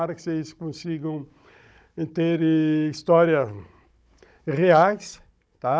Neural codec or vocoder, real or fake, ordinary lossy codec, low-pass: codec, 16 kHz, 16 kbps, FunCodec, trained on Chinese and English, 50 frames a second; fake; none; none